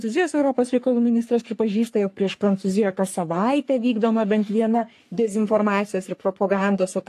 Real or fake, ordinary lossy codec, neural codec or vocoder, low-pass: fake; AAC, 64 kbps; codec, 44.1 kHz, 3.4 kbps, Pupu-Codec; 14.4 kHz